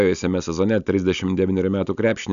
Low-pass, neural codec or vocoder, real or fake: 7.2 kHz; none; real